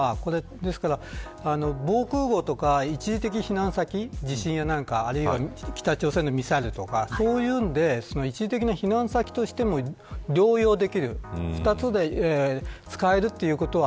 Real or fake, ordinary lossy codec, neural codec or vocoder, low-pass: real; none; none; none